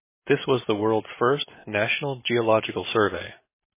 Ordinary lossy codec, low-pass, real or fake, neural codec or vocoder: MP3, 16 kbps; 3.6 kHz; real; none